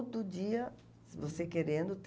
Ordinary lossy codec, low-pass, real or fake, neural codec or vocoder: none; none; real; none